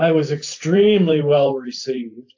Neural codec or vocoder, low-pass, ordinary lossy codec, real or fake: vocoder, 44.1 kHz, 128 mel bands every 512 samples, BigVGAN v2; 7.2 kHz; AAC, 48 kbps; fake